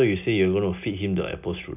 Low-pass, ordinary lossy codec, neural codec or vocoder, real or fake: 3.6 kHz; none; none; real